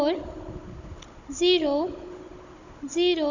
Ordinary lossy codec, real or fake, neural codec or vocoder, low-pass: none; fake; codec, 16 kHz, 16 kbps, FunCodec, trained on Chinese and English, 50 frames a second; 7.2 kHz